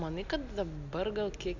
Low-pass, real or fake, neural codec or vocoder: 7.2 kHz; real; none